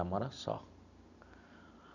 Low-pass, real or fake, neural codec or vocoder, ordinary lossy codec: 7.2 kHz; real; none; none